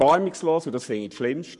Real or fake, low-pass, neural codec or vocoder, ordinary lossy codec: fake; 10.8 kHz; codec, 44.1 kHz, 7.8 kbps, Pupu-Codec; none